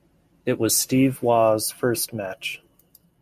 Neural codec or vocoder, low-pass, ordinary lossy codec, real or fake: none; 14.4 kHz; MP3, 64 kbps; real